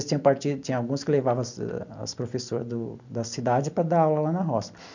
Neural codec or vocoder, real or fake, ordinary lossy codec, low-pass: none; real; none; 7.2 kHz